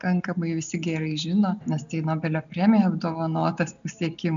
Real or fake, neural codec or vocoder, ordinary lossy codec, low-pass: real; none; MP3, 96 kbps; 7.2 kHz